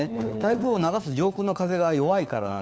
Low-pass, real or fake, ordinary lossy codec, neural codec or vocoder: none; fake; none; codec, 16 kHz, 4 kbps, FunCodec, trained on LibriTTS, 50 frames a second